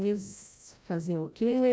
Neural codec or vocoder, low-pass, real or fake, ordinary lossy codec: codec, 16 kHz, 0.5 kbps, FreqCodec, larger model; none; fake; none